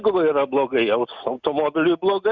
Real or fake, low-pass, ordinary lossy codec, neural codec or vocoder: real; 7.2 kHz; Opus, 64 kbps; none